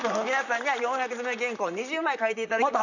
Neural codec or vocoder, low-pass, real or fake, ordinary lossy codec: vocoder, 44.1 kHz, 128 mel bands, Pupu-Vocoder; 7.2 kHz; fake; none